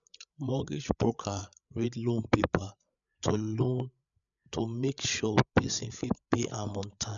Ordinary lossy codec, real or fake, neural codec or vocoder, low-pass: none; fake; codec, 16 kHz, 8 kbps, FreqCodec, larger model; 7.2 kHz